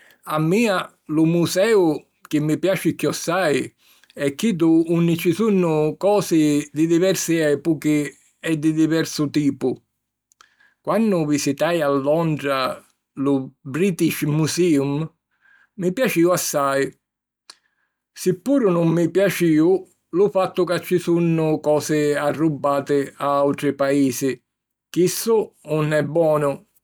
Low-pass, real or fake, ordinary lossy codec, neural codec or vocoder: none; real; none; none